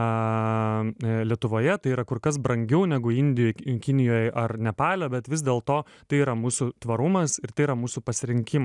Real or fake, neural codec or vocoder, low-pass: real; none; 10.8 kHz